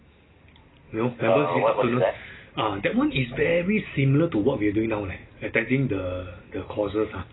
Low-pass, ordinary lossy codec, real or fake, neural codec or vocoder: 7.2 kHz; AAC, 16 kbps; real; none